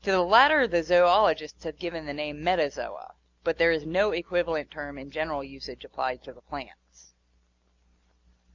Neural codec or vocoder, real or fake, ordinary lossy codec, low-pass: none; real; Opus, 64 kbps; 7.2 kHz